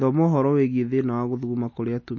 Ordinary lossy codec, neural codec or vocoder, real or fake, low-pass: MP3, 32 kbps; none; real; 7.2 kHz